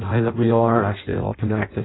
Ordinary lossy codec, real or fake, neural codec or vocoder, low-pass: AAC, 16 kbps; fake; codec, 16 kHz in and 24 kHz out, 0.6 kbps, FireRedTTS-2 codec; 7.2 kHz